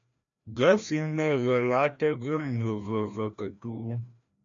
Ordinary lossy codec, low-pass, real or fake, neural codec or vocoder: MP3, 64 kbps; 7.2 kHz; fake; codec, 16 kHz, 1 kbps, FreqCodec, larger model